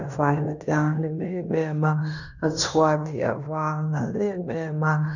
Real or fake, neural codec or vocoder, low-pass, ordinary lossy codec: fake; codec, 16 kHz in and 24 kHz out, 0.9 kbps, LongCat-Audio-Codec, fine tuned four codebook decoder; 7.2 kHz; none